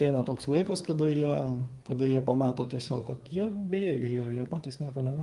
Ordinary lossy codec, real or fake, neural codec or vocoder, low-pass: Opus, 32 kbps; fake; codec, 24 kHz, 1 kbps, SNAC; 10.8 kHz